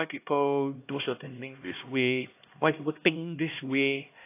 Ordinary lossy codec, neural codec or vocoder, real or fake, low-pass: AAC, 24 kbps; codec, 16 kHz, 1 kbps, X-Codec, HuBERT features, trained on LibriSpeech; fake; 3.6 kHz